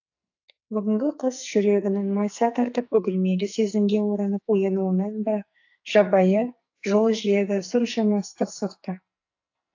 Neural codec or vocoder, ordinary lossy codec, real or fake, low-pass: codec, 44.1 kHz, 2.6 kbps, SNAC; AAC, 48 kbps; fake; 7.2 kHz